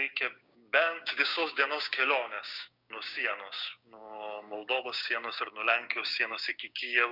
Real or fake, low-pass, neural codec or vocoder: fake; 5.4 kHz; codec, 16 kHz, 6 kbps, DAC